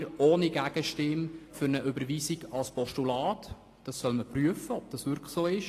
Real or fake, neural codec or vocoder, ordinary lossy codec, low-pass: real; none; AAC, 48 kbps; 14.4 kHz